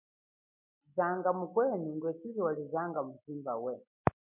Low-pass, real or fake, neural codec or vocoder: 3.6 kHz; real; none